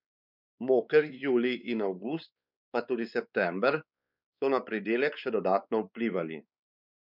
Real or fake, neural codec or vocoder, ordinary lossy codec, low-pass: fake; codec, 16 kHz, 4 kbps, X-Codec, WavLM features, trained on Multilingual LibriSpeech; none; 5.4 kHz